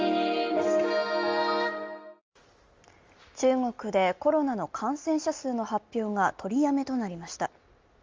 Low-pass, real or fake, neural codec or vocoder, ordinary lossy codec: 7.2 kHz; real; none; Opus, 32 kbps